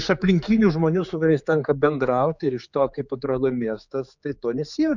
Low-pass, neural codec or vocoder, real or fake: 7.2 kHz; codec, 16 kHz, 4 kbps, X-Codec, HuBERT features, trained on general audio; fake